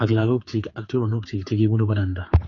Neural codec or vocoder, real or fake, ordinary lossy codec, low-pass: codec, 16 kHz, 4 kbps, X-Codec, HuBERT features, trained on general audio; fake; AAC, 32 kbps; 7.2 kHz